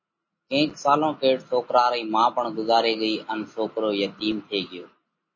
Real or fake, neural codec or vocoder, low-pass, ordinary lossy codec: real; none; 7.2 kHz; MP3, 32 kbps